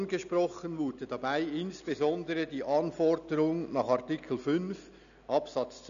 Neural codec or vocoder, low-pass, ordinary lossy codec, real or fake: none; 7.2 kHz; none; real